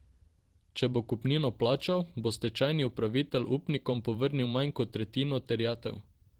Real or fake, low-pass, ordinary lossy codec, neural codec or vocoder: fake; 19.8 kHz; Opus, 16 kbps; vocoder, 44.1 kHz, 128 mel bands every 512 samples, BigVGAN v2